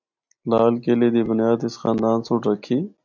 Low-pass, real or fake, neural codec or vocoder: 7.2 kHz; real; none